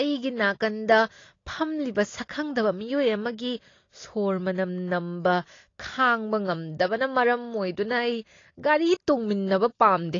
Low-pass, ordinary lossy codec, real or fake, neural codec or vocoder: 7.2 kHz; AAC, 32 kbps; real; none